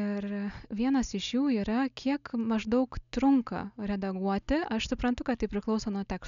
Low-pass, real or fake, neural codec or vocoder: 7.2 kHz; real; none